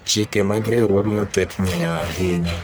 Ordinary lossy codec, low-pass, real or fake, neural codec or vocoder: none; none; fake; codec, 44.1 kHz, 1.7 kbps, Pupu-Codec